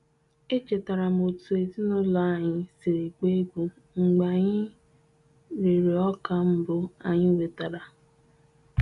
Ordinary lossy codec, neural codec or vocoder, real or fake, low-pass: none; none; real; 10.8 kHz